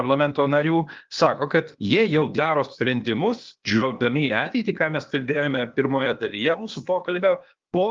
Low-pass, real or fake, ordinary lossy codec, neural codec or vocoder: 7.2 kHz; fake; Opus, 32 kbps; codec, 16 kHz, 0.8 kbps, ZipCodec